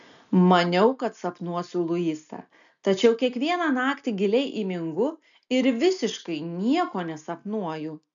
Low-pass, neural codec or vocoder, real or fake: 7.2 kHz; none; real